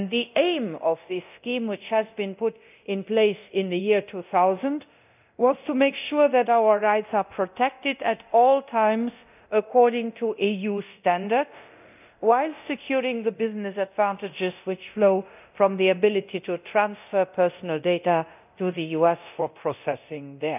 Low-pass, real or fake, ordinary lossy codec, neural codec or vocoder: 3.6 kHz; fake; none; codec, 24 kHz, 0.9 kbps, DualCodec